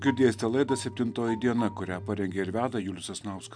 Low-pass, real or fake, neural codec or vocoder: 9.9 kHz; real; none